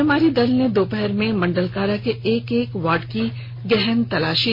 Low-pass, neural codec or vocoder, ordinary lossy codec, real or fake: 5.4 kHz; none; MP3, 32 kbps; real